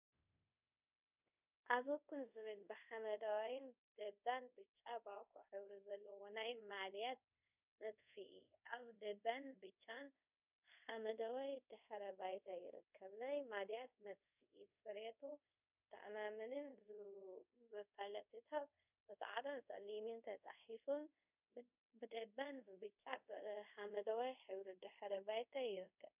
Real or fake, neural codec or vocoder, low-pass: fake; codec, 24 kHz, 0.5 kbps, DualCodec; 3.6 kHz